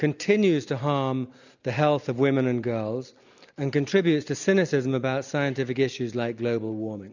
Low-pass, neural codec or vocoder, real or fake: 7.2 kHz; none; real